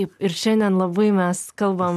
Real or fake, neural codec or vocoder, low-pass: real; none; 14.4 kHz